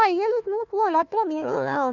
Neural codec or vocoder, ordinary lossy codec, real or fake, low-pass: codec, 16 kHz, 1 kbps, FunCodec, trained on Chinese and English, 50 frames a second; none; fake; 7.2 kHz